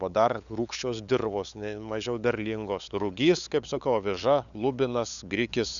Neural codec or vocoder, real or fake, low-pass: codec, 16 kHz, 8 kbps, FunCodec, trained on LibriTTS, 25 frames a second; fake; 7.2 kHz